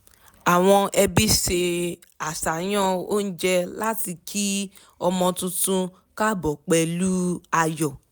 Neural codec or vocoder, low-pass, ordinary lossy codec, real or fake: none; none; none; real